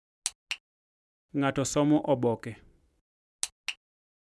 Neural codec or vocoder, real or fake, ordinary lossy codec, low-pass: none; real; none; none